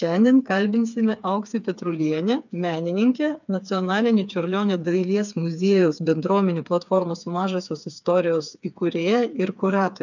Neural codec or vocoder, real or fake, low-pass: codec, 16 kHz, 4 kbps, FreqCodec, smaller model; fake; 7.2 kHz